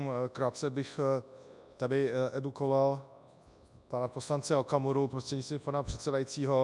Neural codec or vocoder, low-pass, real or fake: codec, 24 kHz, 0.9 kbps, WavTokenizer, large speech release; 10.8 kHz; fake